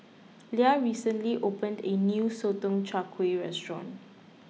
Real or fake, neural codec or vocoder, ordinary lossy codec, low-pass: real; none; none; none